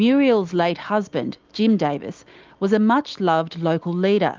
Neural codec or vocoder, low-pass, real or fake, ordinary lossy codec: none; 7.2 kHz; real; Opus, 32 kbps